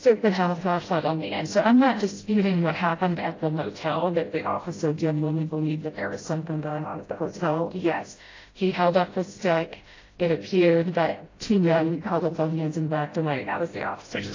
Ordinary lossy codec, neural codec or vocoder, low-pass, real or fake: AAC, 32 kbps; codec, 16 kHz, 0.5 kbps, FreqCodec, smaller model; 7.2 kHz; fake